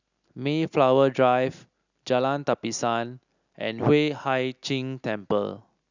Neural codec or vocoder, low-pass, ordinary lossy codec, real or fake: none; 7.2 kHz; none; real